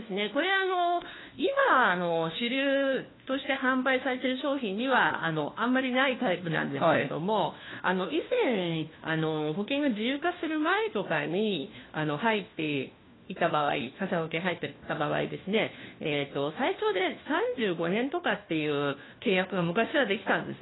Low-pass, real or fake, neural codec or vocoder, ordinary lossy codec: 7.2 kHz; fake; codec, 16 kHz, 1 kbps, FunCodec, trained on LibriTTS, 50 frames a second; AAC, 16 kbps